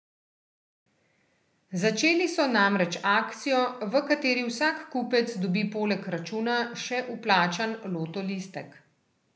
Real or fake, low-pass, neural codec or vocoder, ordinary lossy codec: real; none; none; none